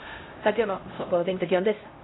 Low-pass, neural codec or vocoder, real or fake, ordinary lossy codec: 7.2 kHz; codec, 16 kHz, 0.5 kbps, X-Codec, HuBERT features, trained on LibriSpeech; fake; AAC, 16 kbps